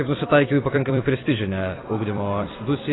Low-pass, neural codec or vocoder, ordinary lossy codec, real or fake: 7.2 kHz; vocoder, 44.1 kHz, 128 mel bands every 256 samples, BigVGAN v2; AAC, 16 kbps; fake